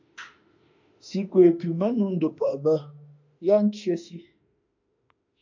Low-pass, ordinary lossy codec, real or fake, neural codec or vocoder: 7.2 kHz; MP3, 48 kbps; fake; autoencoder, 48 kHz, 32 numbers a frame, DAC-VAE, trained on Japanese speech